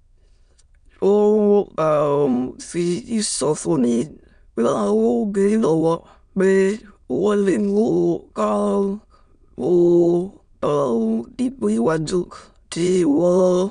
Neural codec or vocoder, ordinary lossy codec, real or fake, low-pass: autoencoder, 22.05 kHz, a latent of 192 numbers a frame, VITS, trained on many speakers; none; fake; 9.9 kHz